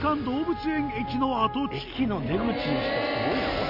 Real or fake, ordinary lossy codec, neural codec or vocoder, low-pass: real; none; none; 5.4 kHz